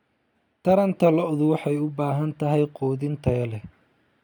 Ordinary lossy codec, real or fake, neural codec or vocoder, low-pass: none; real; none; 19.8 kHz